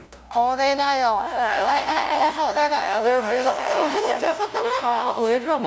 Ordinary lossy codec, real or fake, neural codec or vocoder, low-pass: none; fake; codec, 16 kHz, 0.5 kbps, FunCodec, trained on LibriTTS, 25 frames a second; none